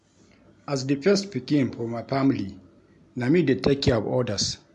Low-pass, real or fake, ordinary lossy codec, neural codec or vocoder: 10.8 kHz; real; MP3, 64 kbps; none